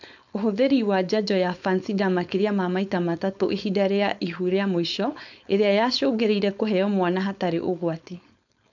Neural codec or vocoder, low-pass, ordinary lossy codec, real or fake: codec, 16 kHz, 4.8 kbps, FACodec; 7.2 kHz; none; fake